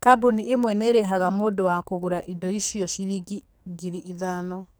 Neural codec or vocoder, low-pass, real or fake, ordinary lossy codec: codec, 44.1 kHz, 2.6 kbps, SNAC; none; fake; none